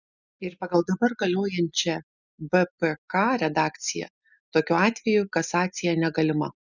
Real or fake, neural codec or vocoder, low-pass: real; none; 7.2 kHz